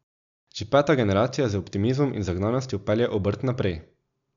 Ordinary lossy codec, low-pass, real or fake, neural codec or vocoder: none; 7.2 kHz; real; none